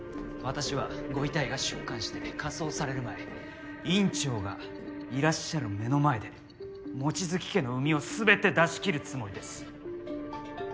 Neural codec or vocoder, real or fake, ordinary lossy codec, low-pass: none; real; none; none